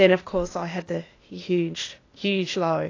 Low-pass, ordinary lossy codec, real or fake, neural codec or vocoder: 7.2 kHz; AAC, 32 kbps; fake; codec, 16 kHz, 0.8 kbps, ZipCodec